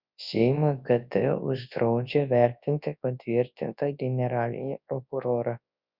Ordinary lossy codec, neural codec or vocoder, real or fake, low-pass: Opus, 64 kbps; codec, 24 kHz, 0.9 kbps, WavTokenizer, large speech release; fake; 5.4 kHz